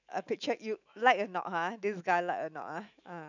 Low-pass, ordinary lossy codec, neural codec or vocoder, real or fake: 7.2 kHz; none; autoencoder, 48 kHz, 128 numbers a frame, DAC-VAE, trained on Japanese speech; fake